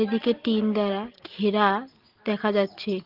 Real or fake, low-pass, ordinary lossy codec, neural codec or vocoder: real; 5.4 kHz; Opus, 16 kbps; none